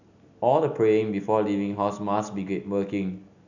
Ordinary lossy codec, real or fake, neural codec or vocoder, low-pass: none; real; none; 7.2 kHz